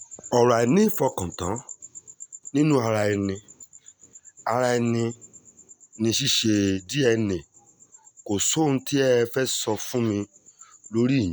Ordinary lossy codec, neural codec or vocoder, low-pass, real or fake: none; none; none; real